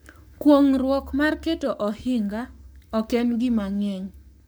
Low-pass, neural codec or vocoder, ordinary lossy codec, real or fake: none; codec, 44.1 kHz, 7.8 kbps, Pupu-Codec; none; fake